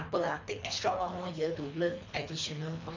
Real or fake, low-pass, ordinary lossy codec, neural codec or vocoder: fake; 7.2 kHz; MP3, 64 kbps; codec, 24 kHz, 3 kbps, HILCodec